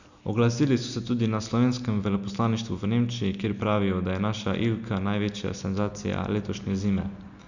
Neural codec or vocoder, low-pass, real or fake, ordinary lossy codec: none; 7.2 kHz; real; none